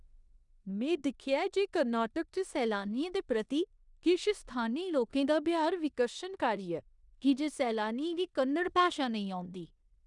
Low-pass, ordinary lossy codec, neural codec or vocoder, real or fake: 10.8 kHz; none; codec, 16 kHz in and 24 kHz out, 0.9 kbps, LongCat-Audio-Codec, four codebook decoder; fake